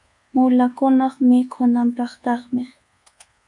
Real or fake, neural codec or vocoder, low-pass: fake; codec, 24 kHz, 1.2 kbps, DualCodec; 10.8 kHz